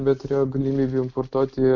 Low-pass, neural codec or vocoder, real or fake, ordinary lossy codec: 7.2 kHz; none; real; Opus, 64 kbps